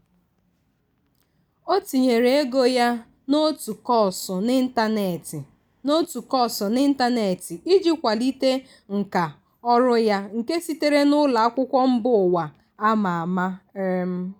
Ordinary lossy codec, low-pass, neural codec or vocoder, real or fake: none; none; none; real